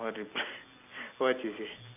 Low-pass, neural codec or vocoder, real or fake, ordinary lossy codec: 3.6 kHz; none; real; none